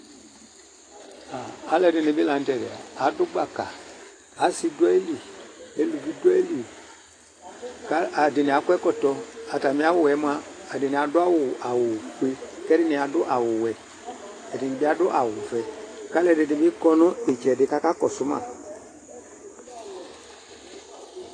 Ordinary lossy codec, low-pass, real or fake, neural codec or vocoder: AAC, 32 kbps; 9.9 kHz; real; none